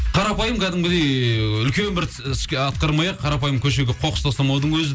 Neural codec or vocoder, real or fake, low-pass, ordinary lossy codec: none; real; none; none